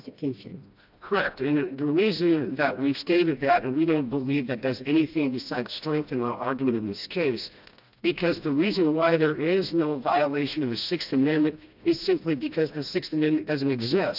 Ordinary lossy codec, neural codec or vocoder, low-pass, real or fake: AAC, 48 kbps; codec, 16 kHz, 1 kbps, FreqCodec, smaller model; 5.4 kHz; fake